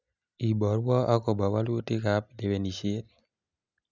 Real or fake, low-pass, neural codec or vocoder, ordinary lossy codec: real; 7.2 kHz; none; none